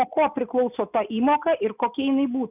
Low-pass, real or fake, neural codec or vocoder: 3.6 kHz; real; none